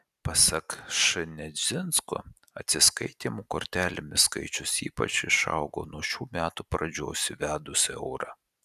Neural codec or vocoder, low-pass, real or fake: none; 14.4 kHz; real